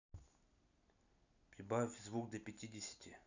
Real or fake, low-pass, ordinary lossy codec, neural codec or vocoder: real; 7.2 kHz; none; none